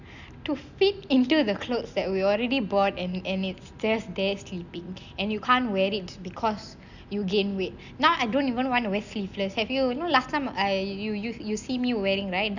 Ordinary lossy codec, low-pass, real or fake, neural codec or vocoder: none; 7.2 kHz; real; none